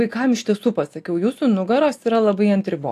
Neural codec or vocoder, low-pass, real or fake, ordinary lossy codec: none; 14.4 kHz; real; AAC, 64 kbps